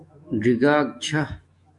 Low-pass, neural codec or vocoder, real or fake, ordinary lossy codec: 10.8 kHz; autoencoder, 48 kHz, 128 numbers a frame, DAC-VAE, trained on Japanese speech; fake; MP3, 64 kbps